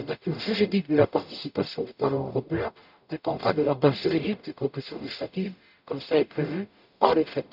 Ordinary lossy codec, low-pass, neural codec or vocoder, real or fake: none; 5.4 kHz; codec, 44.1 kHz, 0.9 kbps, DAC; fake